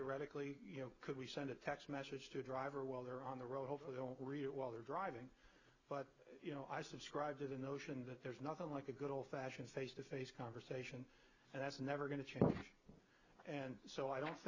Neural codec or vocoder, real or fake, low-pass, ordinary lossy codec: none; real; 7.2 kHz; Opus, 64 kbps